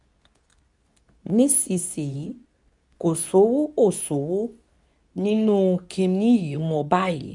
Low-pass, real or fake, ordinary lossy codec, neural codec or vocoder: 10.8 kHz; fake; none; codec, 24 kHz, 0.9 kbps, WavTokenizer, medium speech release version 2